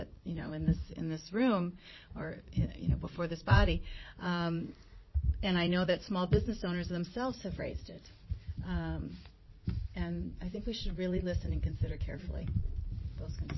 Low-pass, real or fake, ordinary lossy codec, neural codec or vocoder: 7.2 kHz; fake; MP3, 24 kbps; vocoder, 44.1 kHz, 80 mel bands, Vocos